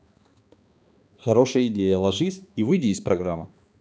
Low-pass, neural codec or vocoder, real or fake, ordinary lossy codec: none; codec, 16 kHz, 2 kbps, X-Codec, HuBERT features, trained on balanced general audio; fake; none